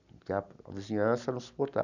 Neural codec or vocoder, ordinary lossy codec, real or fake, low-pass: none; none; real; 7.2 kHz